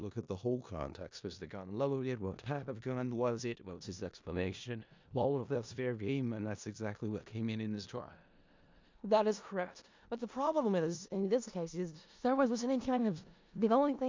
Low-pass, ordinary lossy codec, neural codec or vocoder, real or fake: 7.2 kHz; MP3, 64 kbps; codec, 16 kHz in and 24 kHz out, 0.4 kbps, LongCat-Audio-Codec, four codebook decoder; fake